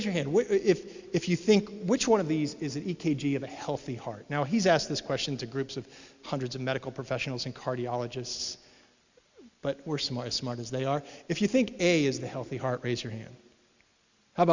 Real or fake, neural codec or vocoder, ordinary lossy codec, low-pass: real; none; Opus, 64 kbps; 7.2 kHz